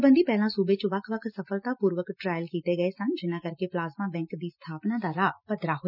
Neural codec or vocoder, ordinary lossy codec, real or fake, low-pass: none; none; real; 5.4 kHz